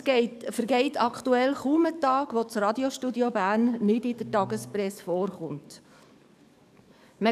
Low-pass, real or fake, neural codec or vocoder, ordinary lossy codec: 14.4 kHz; fake; codec, 44.1 kHz, 7.8 kbps, DAC; none